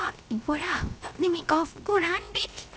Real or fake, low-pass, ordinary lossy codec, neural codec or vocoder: fake; none; none; codec, 16 kHz, 0.3 kbps, FocalCodec